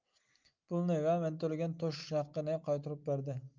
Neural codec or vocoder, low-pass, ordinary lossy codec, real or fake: none; 7.2 kHz; Opus, 24 kbps; real